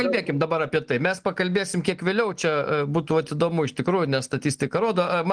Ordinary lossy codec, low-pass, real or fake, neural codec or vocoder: Opus, 24 kbps; 9.9 kHz; real; none